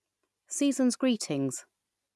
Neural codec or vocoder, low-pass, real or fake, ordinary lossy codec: none; none; real; none